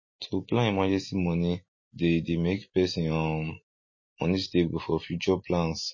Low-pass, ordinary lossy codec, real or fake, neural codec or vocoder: 7.2 kHz; MP3, 32 kbps; real; none